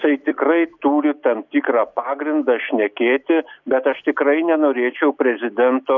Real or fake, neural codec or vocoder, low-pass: real; none; 7.2 kHz